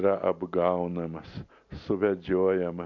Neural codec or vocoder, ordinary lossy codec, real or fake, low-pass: none; MP3, 48 kbps; real; 7.2 kHz